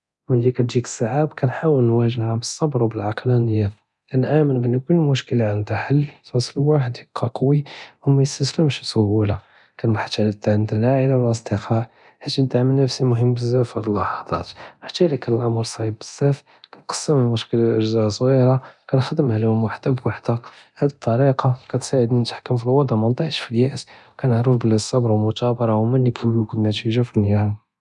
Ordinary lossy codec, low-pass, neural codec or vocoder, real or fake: none; 10.8 kHz; codec, 24 kHz, 0.9 kbps, DualCodec; fake